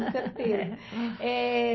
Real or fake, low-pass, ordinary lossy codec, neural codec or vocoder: real; 7.2 kHz; MP3, 24 kbps; none